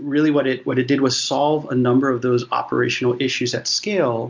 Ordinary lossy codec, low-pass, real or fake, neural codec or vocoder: MP3, 64 kbps; 7.2 kHz; real; none